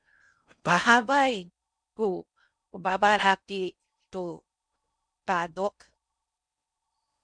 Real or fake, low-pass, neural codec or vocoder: fake; 9.9 kHz; codec, 16 kHz in and 24 kHz out, 0.6 kbps, FocalCodec, streaming, 4096 codes